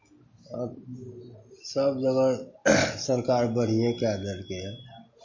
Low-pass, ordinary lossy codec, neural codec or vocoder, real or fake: 7.2 kHz; MP3, 32 kbps; none; real